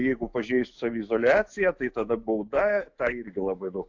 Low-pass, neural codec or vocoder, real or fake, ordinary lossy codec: 7.2 kHz; autoencoder, 48 kHz, 128 numbers a frame, DAC-VAE, trained on Japanese speech; fake; AAC, 48 kbps